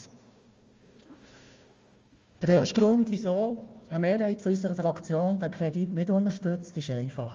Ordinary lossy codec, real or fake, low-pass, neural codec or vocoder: Opus, 32 kbps; fake; 7.2 kHz; codec, 16 kHz, 1 kbps, FunCodec, trained on Chinese and English, 50 frames a second